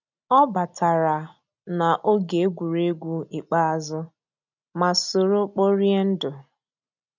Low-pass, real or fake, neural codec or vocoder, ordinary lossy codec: 7.2 kHz; real; none; none